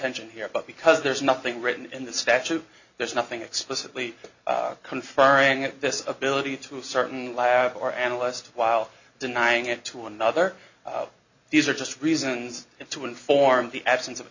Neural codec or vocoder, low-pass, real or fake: none; 7.2 kHz; real